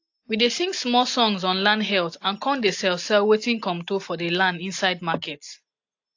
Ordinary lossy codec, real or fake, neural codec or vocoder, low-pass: AAC, 48 kbps; real; none; 7.2 kHz